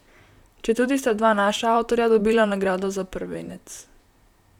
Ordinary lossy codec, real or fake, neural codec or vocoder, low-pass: none; fake; vocoder, 44.1 kHz, 128 mel bands, Pupu-Vocoder; 19.8 kHz